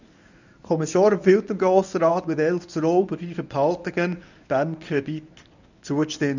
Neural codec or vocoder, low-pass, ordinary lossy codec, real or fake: codec, 24 kHz, 0.9 kbps, WavTokenizer, medium speech release version 1; 7.2 kHz; none; fake